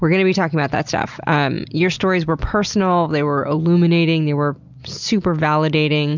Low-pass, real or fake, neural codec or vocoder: 7.2 kHz; real; none